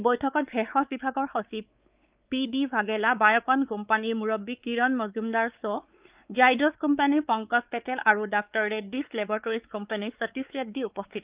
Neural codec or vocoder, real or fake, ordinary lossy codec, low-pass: codec, 16 kHz, 4 kbps, X-Codec, WavLM features, trained on Multilingual LibriSpeech; fake; Opus, 24 kbps; 3.6 kHz